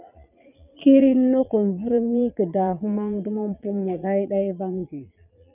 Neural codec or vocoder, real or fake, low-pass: vocoder, 22.05 kHz, 80 mel bands, Vocos; fake; 3.6 kHz